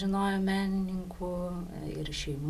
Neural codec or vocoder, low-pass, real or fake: none; 14.4 kHz; real